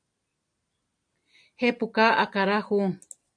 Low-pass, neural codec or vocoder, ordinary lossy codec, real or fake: 9.9 kHz; none; AAC, 64 kbps; real